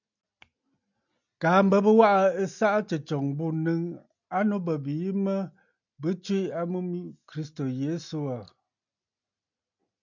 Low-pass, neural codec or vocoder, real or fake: 7.2 kHz; none; real